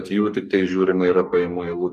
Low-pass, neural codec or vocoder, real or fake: 14.4 kHz; codec, 44.1 kHz, 2.6 kbps, DAC; fake